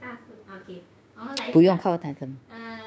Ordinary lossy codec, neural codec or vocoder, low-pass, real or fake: none; codec, 16 kHz, 6 kbps, DAC; none; fake